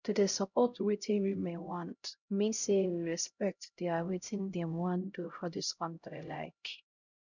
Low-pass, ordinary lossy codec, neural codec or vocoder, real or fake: 7.2 kHz; none; codec, 16 kHz, 0.5 kbps, X-Codec, HuBERT features, trained on LibriSpeech; fake